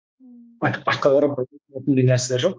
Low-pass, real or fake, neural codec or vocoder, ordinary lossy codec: none; fake; codec, 16 kHz, 2 kbps, X-Codec, HuBERT features, trained on balanced general audio; none